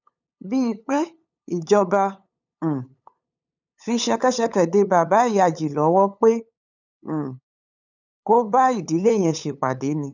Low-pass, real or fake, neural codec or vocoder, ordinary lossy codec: 7.2 kHz; fake; codec, 16 kHz, 8 kbps, FunCodec, trained on LibriTTS, 25 frames a second; none